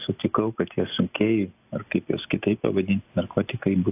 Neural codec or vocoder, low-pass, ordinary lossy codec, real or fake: none; 3.6 kHz; AAC, 32 kbps; real